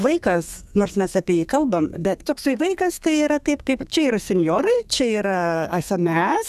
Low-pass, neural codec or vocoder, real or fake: 14.4 kHz; codec, 32 kHz, 1.9 kbps, SNAC; fake